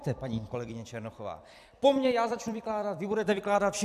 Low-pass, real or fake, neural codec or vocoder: 14.4 kHz; fake; vocoder, 44.1 kHz, 128 mel bands every 256 samples, BigVGAN v2